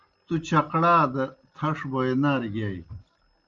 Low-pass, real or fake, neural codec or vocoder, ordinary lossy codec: 7.2 kHz; real; none; Opus, 32 kbps